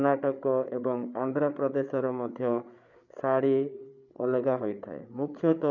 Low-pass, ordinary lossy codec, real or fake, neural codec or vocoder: 7.2 kHz; none; fake; codec, 16 kHz, 8 kbps, FreqCodec, larger model